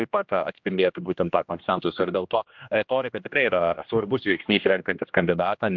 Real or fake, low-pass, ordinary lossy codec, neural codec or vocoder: fake; 7.2 kHz; MP3, 64 kbps; codec, 16 kHz, 1 kbps, X-Codec, HuBERT features, trained on general audio